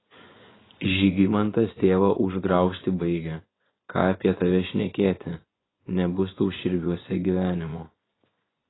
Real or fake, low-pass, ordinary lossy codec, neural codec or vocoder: fake; 7.2 kHz; AAC, 16 kbps; vocoder, 24 kHz, 100 mel bands, Vocos